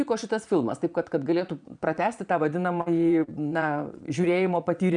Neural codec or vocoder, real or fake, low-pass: vocoder, 22.05 kHz, 80 mel bands, Vocos; fake; 9.9 kHz